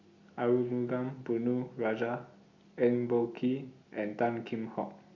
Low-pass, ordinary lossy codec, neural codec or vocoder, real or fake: 7.2 kHz; Opus, 64 kbps; none; real